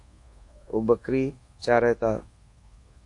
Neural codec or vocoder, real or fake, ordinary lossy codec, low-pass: codec, 24 kHz, 1.2 kbps, DualCodec; fake; AAC, 48 kbps; 10.8 kHz